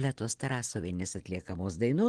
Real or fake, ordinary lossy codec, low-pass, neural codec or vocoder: real; Opus, 16 kbps; 9.9 kHz; none